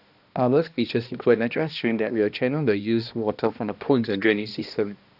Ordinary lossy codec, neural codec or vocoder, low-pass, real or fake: none; codec, 16 kHz, 1 kbps, X-Codec, HuBERT features, trained on balanced general audio; 5.4 kHz; fake